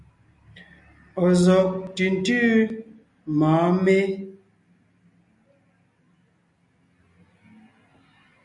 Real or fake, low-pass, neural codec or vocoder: real; 10.8 kHz; none